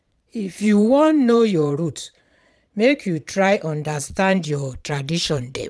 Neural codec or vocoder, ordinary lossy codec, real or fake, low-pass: vocoder, 22.05 kHz, 80 mel bands, Vocos; none; fake; none